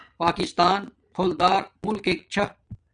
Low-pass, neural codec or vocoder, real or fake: 9.9 kHz; vocoder, 22.05 kHz, 80 mel bands, Vocos; fake